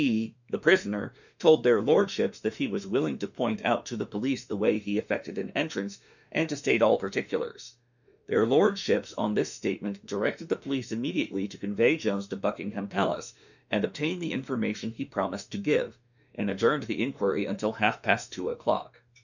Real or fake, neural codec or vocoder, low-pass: fake; autoencoder, 48 kHz, 32 numbers a frame, DAC-VAE, trained on Japanese speech; 7.2 kHz